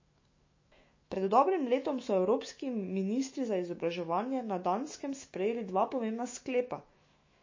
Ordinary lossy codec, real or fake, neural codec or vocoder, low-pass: MP3, 32 kbps; fake; autoencoder, 48 kHz, 128 numbers a frame, DAC-VAE, trained on Japanese speech; 7.2 kHz